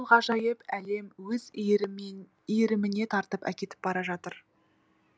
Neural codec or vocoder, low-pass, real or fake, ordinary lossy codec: none; none; real; none